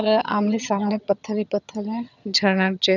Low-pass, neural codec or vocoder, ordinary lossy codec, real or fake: 7.2 kHz; vocoder, 22.05 kHz, 80 mel bands, HiFi-GAN; none; fake